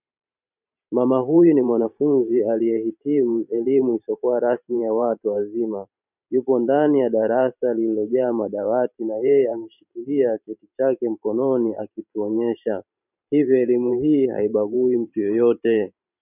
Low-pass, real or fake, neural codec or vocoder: 3.6 kHz; fake; vocoder, 44.1 kHz, 128 mel bands every 512 samples, BigVGAN v2